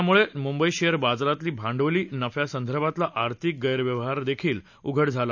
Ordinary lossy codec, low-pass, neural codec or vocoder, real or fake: none; 7.2 kHz; none; real